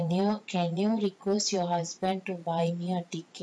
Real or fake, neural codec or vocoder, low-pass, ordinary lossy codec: fake; vocoder, 22.05 kHz, 80 mel bands, Vocos; none; none